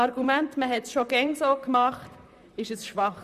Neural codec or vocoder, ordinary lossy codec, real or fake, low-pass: vocoder, 44.1 kHz, 128 mel bands, Pupu-Vocoder; none; fake; 14.4 kHz